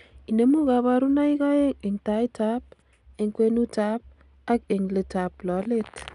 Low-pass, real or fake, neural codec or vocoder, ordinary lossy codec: 10.8 kHz; real; none; none